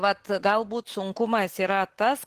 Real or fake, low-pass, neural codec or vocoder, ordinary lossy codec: real; 14.4 kHz; none; Opus, 16 kbps